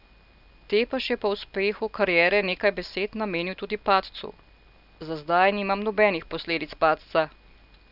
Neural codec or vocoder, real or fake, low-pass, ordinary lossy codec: none; real; 5.4 kHz; none